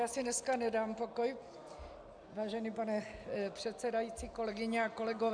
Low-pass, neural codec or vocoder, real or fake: 9.9 kHz; none; real